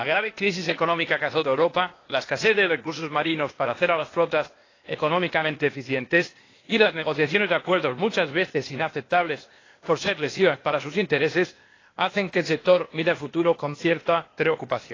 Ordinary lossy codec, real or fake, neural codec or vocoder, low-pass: AAC, 32 kbps; fake; codec, 16 kHz, 0.8 kbps, ZipCodec; 7.2 kHz